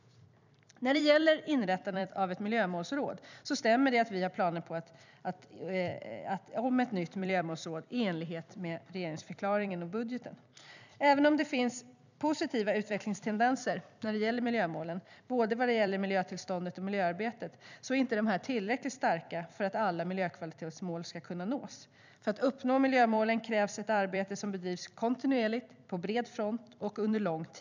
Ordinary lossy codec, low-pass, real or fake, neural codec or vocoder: none; 7.2 kHz; fake; vocoder, 44.1 kHz, 128 mel bands every 256 samples, BigVGAN v2